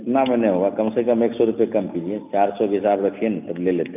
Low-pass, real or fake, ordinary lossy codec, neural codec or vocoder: 3.6 kHz; real; none; none